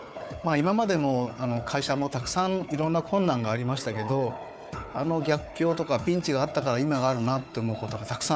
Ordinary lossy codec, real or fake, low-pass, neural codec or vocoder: none; fake; none; codec, 16 kHz, 4 kbps, FunCodec, trained on Chinese and English, 50 frames a second